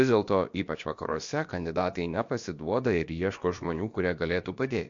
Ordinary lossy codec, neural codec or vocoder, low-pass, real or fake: MP3, 48 kbps; codec, 16 kHz, about 1 kbps, DyCAST, with the encoder's durations; 7.2 kHz; fake